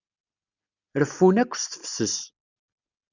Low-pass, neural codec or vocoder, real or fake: 7.2 kHz; none; real